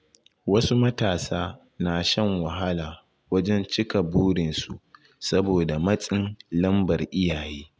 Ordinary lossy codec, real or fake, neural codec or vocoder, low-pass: none; real; none; none